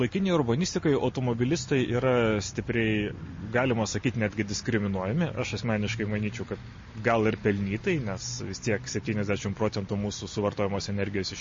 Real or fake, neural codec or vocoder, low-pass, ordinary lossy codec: real; none; 7.2 kHz; MP3, 32 kbps